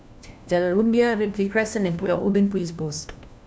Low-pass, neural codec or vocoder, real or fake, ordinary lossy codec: none; codec, 16 kHz, 1 kbps, FunCodec, trained on LibriTTS, 50 frames a second; fake; none